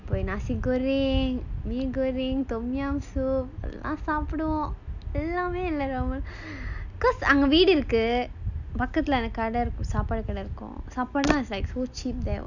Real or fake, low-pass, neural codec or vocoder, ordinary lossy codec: real; 7.2 kHz; none; none